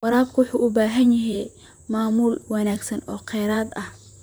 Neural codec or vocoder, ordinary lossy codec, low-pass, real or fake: vocoder, 44.1 kHz, 128 mel bands, Pupu-Vocoder; none; none; fake